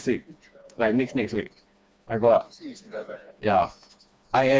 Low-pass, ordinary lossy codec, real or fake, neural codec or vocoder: none; none; fake; codec, 16 kHz, 2 kbps, FreqCodec, smaller model